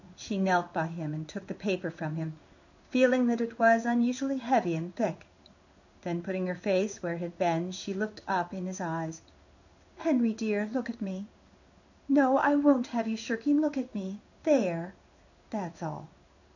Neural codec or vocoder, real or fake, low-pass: codec, 16 kHz in and 24 kHz out, 1 kbps, XY-Tokenizer; fake; 7.2 kHz